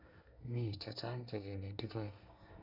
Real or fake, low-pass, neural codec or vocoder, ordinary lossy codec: fake; 5.4 kHz; codec, 24 kHz, 1 kbps, SNAC; none